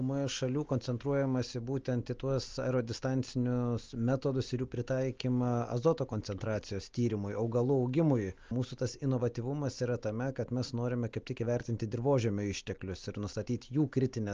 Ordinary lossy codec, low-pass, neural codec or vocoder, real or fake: Opus, 24 kbps; 7.2 kHz; none; real